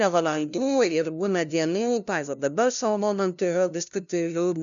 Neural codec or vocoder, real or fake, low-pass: codec, 16 kHz, 0.5 kbps, FunCodec, trained on LibriTTS, 25 frames a second; fake; 7.2 kHz